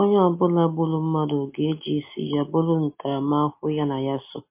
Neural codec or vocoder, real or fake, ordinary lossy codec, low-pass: none; real; MP3, 24 kbps; 3.6 kHz